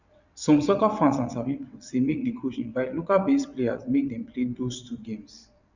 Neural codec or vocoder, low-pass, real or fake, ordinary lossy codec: vocoder, 22.05 kHz, 80 mel bands, WaveNeXt; 7.2 kHz; fake; none